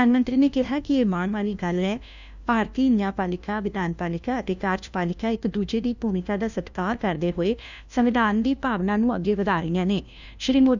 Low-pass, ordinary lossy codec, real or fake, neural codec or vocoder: 7.2 kHz; none; fake; codec, 16 kHz, 1 kbps, FunCodec, trained on LibriTTS, 50 frames a second